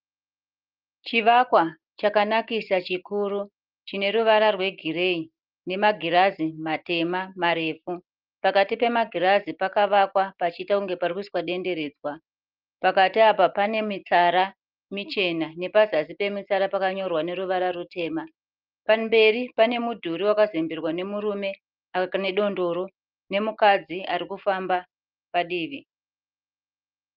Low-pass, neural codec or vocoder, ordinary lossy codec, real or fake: 5.4 kHz; none; Opus, 32 kbps; real